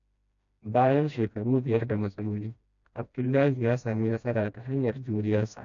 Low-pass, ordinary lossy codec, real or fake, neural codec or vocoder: 7.2 kHz; none; fake; codec, 16 kHz, 1 kbps, FreqCodec, smaller model